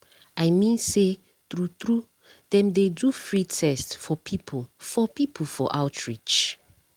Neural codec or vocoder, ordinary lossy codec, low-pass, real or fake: none; Opus, 24 kbps; 19.8 kHz; real